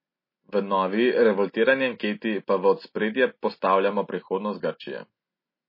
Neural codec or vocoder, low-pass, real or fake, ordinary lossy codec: none; 5.4 kHz; real; MP3, 24 kbps